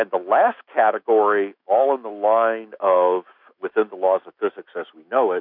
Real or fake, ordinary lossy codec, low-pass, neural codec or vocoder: fake; MP3, 48 kbps; 5.4 kHz; autoencoder, 48 kHz, 128 numbers a frame, DAC-VAE, trained on Japanese speech